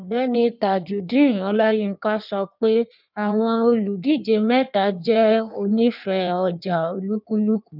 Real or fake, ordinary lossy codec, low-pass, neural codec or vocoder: fake; none; 5.4 kHz; codec, 16 kHz in and 24 kHz out, 1.1 kbps, FireRedTTS-2 codec